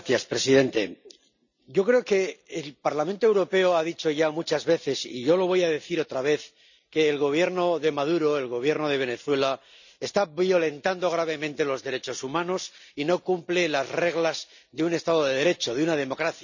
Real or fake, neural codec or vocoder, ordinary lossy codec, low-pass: real; none; none; 7.2 kHz